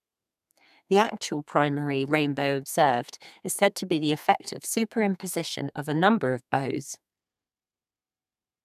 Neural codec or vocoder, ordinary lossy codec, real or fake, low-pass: codec, 32 kHz, 1.9 kbps, SNAC; none; fake; 14.4 kHz